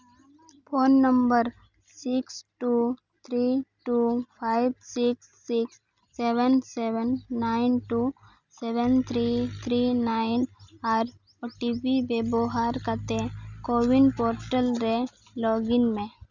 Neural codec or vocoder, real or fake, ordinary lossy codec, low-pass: none; real; none; 7.2 kHz